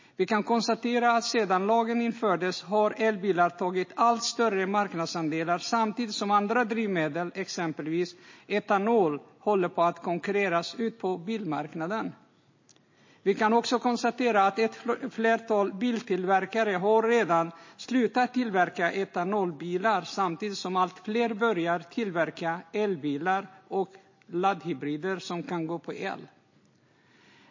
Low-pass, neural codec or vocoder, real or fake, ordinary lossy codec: 7.2 kHz; none; real; MP3, 32 kbps